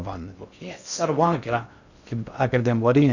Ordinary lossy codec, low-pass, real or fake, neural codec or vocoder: none; 7.2 kHz; fake; codec, 16 kHz in and 24 kHz out, 0.6 kbps, FocalCodec, streaming, 2048 codes